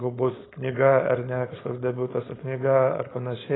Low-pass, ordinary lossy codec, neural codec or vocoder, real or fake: 7.2 kHz; AAC, 16 kbps; codec, 16 kHz, 4.8 kbps, FACodec; fake